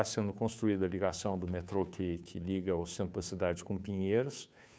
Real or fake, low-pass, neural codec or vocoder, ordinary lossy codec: fake; none; codec, 16 kHz, 2 kbps, FunCodec, trained on Chinese and English, 25 frames a second; none